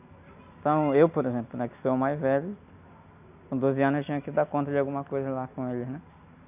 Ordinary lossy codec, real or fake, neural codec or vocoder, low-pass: none; real; none; 3.6 kHz